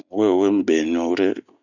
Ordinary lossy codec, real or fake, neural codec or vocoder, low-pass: none; real; none; 7.2 kHz